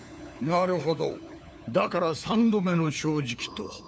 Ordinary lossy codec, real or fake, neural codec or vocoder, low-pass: none; fake; codec, 16 kHz, 4 kbps, FunCodec, trained on LibriTTS, 50 frames a second; none